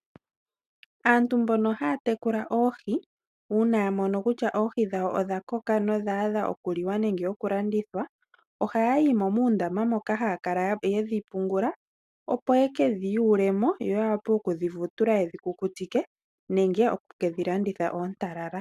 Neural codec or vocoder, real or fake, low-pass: none; real; 14.4 kHz